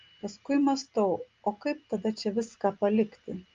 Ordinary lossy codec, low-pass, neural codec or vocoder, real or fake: Opus, 32 kbps; 7.2 kHz; none; real